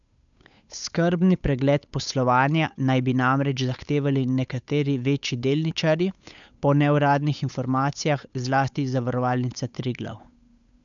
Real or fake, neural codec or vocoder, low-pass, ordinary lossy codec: fake; codec, 16 kHz, 8 kbps, FunCodec, trained on Chinese and English, 25 frames a second; 7.2 kHz; none